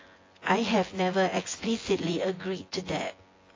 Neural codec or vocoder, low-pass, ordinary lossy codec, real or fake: vocoder, 24 kHz, 100 mel bands, Vocos; 7.2 kHz; AAC, 32 kbps; fake